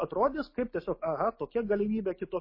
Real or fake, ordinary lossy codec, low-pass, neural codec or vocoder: real; MP3, 24 kbps; 5.4 kHz; none